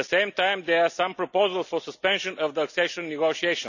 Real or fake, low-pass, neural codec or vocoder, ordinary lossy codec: real; 7.2 kHz; none; none